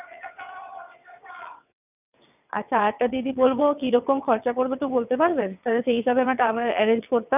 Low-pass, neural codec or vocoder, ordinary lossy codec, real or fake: 3.6 kHz; vocoder, 22.05 kHz, 80 mel bands, WaveNeXt; none; fake